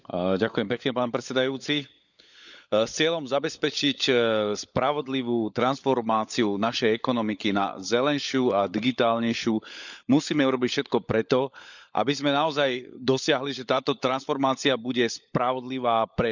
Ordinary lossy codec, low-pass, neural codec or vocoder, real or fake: none; 7.2 kHz; codec, 16 kHz, 16 kbps, FunCodec, trained on LibriTTS, 50 frames a second; fake